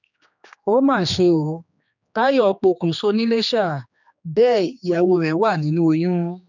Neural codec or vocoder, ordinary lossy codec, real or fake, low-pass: codec, 16 kHz, 2 kbps, X-Codec, HuBERT features, trained on general audio; none; fake; 7.2 kHz